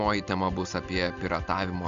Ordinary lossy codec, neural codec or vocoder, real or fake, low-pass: Opus, 64 kbps; none; real; 7.2 kHz